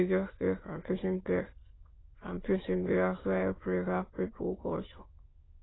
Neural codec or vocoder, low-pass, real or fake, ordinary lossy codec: autoencoder, 22.05 kHz, a latent of 192 numbers a frame, VITS, trained on many speakers; 7.2 kHz; fake; AAC, 16 kbps